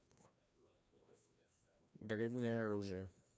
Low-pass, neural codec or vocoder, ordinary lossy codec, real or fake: none; codec, 16 kHz, 1 kbps, FreqCodec, larger model; none; fake